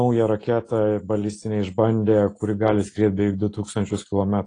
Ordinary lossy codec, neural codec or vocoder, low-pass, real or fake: AAC, 32 kbps; none; 9.9 kHz; real